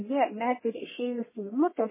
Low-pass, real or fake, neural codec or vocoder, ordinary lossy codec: 3.6 kHz; fake; codec, 44.1 kHz, 1.7 kbps, Pupu-Codec; MP3, 16 kbps